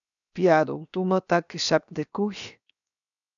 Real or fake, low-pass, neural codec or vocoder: fake; 7.2 kHz; codec, 16 kHz, 0.7 kbps, FocalCodec